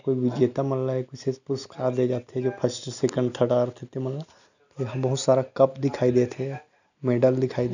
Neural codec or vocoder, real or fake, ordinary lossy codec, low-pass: none; real; none; 7.2 kHz